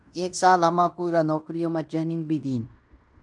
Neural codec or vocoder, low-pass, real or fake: codec, 16 kHz in and 24 kHz out, 0.9 kbps, LongCat-Audio-Codec, fine tuned four codebook decoder; 10.8 kHz; fake